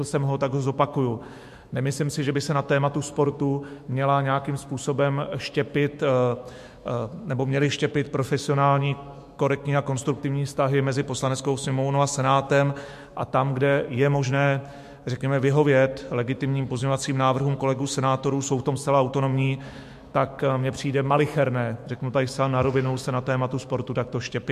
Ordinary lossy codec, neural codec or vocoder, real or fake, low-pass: MP3, 64 kbps; autoencoder, 48 kHz, 128 numbers a frame, DAC-VAE, trained on Japanese speech; fake; 14.4 kHz